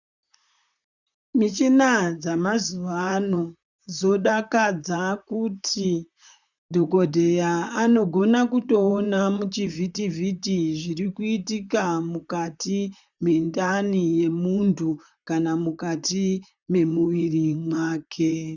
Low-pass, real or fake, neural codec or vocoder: 7.2 kHz; fake; vocoder, 44.1 kHz, 128 mel bands, Pupu-Vocoder